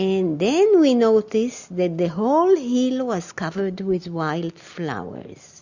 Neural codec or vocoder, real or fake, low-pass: none; real; 7.2 kHz